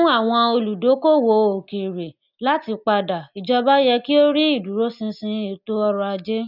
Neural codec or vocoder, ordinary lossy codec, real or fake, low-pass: none; none; real; 5.4 kHz